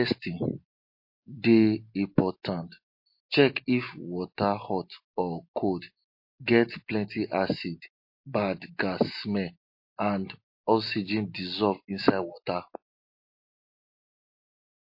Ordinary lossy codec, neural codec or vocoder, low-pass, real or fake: MP3, 32 kbps; none; 5.4 kHz; real